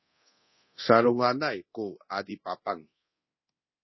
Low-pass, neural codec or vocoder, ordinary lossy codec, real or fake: 7.2 kHz; codec, 24 kHz, 0.9 kbps, DualCodec; MP3, 24 kbps; fake